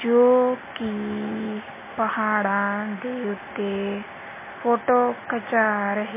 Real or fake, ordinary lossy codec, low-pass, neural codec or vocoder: real; MP3, 16 kbps; 3.6 kHz; none